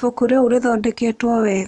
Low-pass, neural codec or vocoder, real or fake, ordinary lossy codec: 10.8 kHz; vocoder, 24 kHz, 100 mel bands, Vocos; fake; none